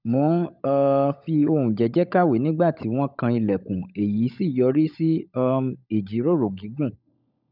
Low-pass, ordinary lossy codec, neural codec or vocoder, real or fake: 5.4 kHz; none; codec, 16 kHz, 16 kbps, FunCodec, trained on LibriTTS, 50 frames a second; fake